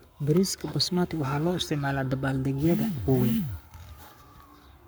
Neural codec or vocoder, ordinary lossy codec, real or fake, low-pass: codec, 44.1 kHz, 7.8 kbps, Pupu-Codec; none; fake; none